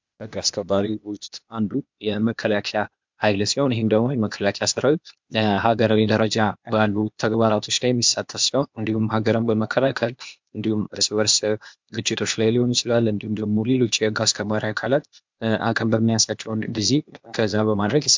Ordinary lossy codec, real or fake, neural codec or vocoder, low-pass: MP3, 64 kbps; fake; codec, 16 kHz, 0.8 kbps, ZipCodec; 7.2 kHz